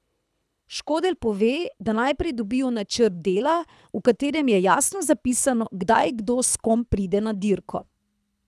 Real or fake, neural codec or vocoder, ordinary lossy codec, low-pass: fake; codec, 24 kHz, 6 kbps, HILCodec; none; none